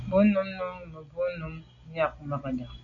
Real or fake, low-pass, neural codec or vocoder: real; 7.2 kHz; none